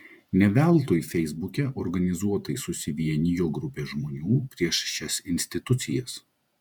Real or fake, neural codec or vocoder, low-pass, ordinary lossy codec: fake; vocoder, 48 kHz, 128 mel bands, Vocos; 19.8 kHz; MP3, 96 kbps